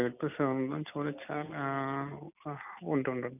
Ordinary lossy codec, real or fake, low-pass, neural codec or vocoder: none; real; 3.6 kHz; none